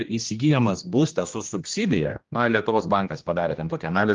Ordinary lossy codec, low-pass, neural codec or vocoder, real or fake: Opus, 32 kbps; 7.2 kHz; codec, 16 kHz, 1 kbps, X-Codec, HuBERT features, trained on general audio; fake